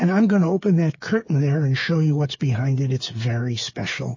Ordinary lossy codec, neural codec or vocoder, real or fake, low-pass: MP3, 32 kbps; codec, 16 kHz, 8 kbps, FreqCodec, smaller model; fake; 7.2 kHz